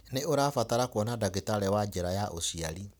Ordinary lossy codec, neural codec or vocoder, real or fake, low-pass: none; none; real; none